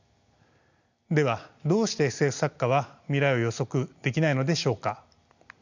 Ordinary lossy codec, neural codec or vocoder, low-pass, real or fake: none; none; 7.2 kHz; real